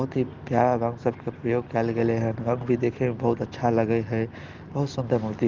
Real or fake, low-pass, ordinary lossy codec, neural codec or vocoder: real; 7.2 kHz; Opus, 16 kbps; none